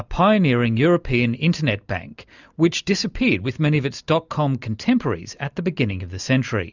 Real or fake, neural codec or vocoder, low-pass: real; none; 7.2 kHz